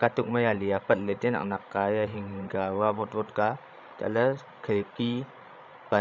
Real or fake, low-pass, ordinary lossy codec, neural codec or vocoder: fake; 7.2 kHz; none; codec, 16 kHz, 16 kbps, FreqCodec, larger model